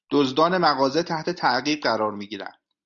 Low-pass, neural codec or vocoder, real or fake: 7.2 kHz; none; real